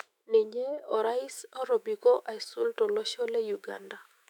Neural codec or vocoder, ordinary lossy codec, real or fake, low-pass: autoencoder, 48 kHz, 128 numbers a frame, DAC-VAE, trained on Japanese speech; none; fake; 19.8 kHz